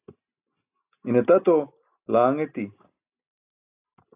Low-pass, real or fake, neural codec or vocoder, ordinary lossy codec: 3.6 kHz; real; none; AAC, 32 kbps